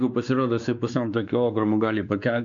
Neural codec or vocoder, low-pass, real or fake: codec, 16 kHz, 2 kbps, X-Codec, WavLM features, trained on Multilingual LibriSpeech; 7.2 kHz; fake